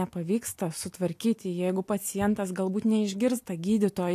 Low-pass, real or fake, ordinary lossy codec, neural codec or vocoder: 14.4 kHz; real; AAC, 64 kbps; none